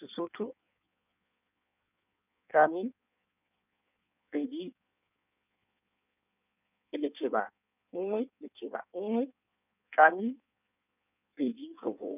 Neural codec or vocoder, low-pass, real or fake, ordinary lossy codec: codec, 16 kHz in and 24 kHz out, 1.1 kbps, FireRedTTS-2 codec; 3.6 kHz; fake; none